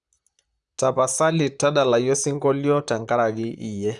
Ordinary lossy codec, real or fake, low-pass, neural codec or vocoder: Opus, 64 kbps; fake; 10.8 kHz; vocoder, 44.1 kHz, 128 mel bands, Pupu-Vocoder